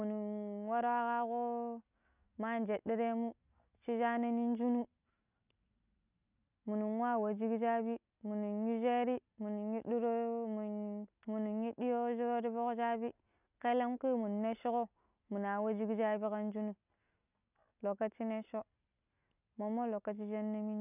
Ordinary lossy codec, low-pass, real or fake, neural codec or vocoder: none; 3.6 kHz; real; none